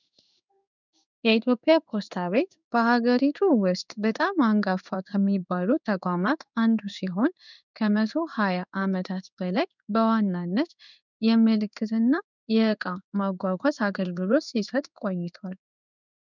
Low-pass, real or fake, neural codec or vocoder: 7.2 kHz; fake; codec, 16 kHz in and 24 kHz out, 1 kbps, XY-Tokenizer